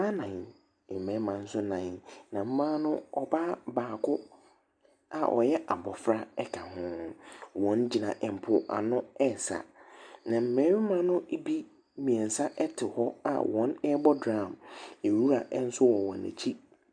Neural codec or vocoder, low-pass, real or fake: none; 9.9 kHz; real